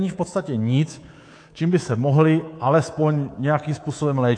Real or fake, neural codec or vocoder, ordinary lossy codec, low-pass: fake; codec, 24 kHz, 3.1 kbps, DualCodec; AAC, 48 kbps; 9.9 kHz